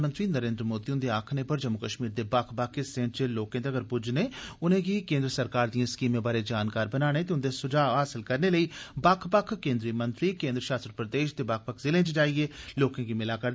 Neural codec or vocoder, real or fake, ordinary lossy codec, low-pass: none; real; none; none